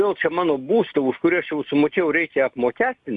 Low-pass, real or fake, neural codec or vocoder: 10.8 kHz; real; none